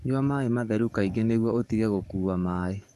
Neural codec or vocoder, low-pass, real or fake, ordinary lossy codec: codec, 44.1 kHz, 7.8 kbps, DAC; 14.4 kHz; fake; none